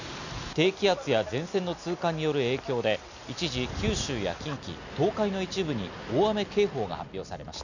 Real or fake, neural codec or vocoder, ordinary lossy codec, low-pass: real; none; none; 7.2 kHz